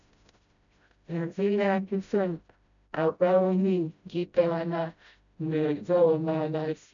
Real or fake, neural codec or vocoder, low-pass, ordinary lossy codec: fake; codec, 16 kHz, 0.5 kbps, FreqCodec, smaller model; 7.2 kHz; MP3, 96 kbps